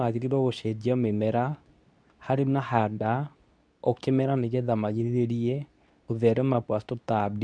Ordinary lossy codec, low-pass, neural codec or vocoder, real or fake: none; 9.9 kHz; codec, 24 kHz, 0.9 kbps, WavTokenizer, medium speech release version 2; fake